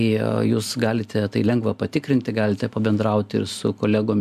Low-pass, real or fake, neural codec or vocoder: 14.4 kHz; real; none